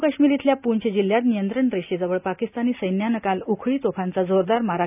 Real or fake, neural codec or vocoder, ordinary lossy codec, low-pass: real; none; none; 3.6 kHz